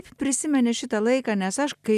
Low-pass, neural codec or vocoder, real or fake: 14.4 kHz; none; real